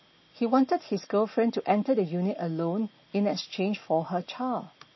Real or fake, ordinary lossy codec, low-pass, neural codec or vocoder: real; MP3, 24 kbps; 7.2 kHz; none